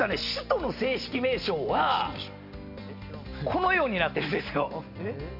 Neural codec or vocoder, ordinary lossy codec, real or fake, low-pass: none; AAC, 48 kbps; real; 5.4 kHz